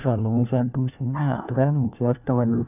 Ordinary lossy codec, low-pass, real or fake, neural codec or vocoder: none; 3.6 kHz; fake; codec, 16 kHz, 1 kbps, FunCodec, trained on LibriTTS, 50 frames a second